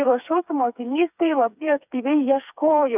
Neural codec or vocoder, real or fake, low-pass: codec, 16 kHz, 4 kbps, FreqCodec, smaller model; fake; 3.6 kHz